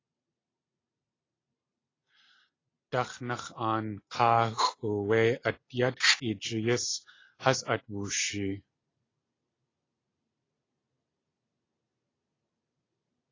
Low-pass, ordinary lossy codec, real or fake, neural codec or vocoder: 7.2 kHz; AAC, 32 kbps; real; none